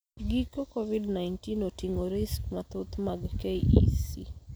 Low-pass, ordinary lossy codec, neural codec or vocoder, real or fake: none; none; none; real